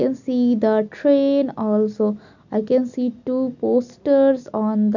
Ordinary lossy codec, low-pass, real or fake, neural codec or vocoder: none; 7.2 kHz; real; none